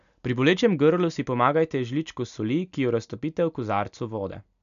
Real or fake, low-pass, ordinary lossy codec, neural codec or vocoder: real; 7.2 kHz; none; none